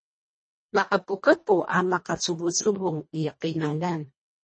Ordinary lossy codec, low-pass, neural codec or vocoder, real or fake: MP3, 32 kbps; 9.9 kHz; codec, 24 kHz, 1.5 kbps, HILCodec; fake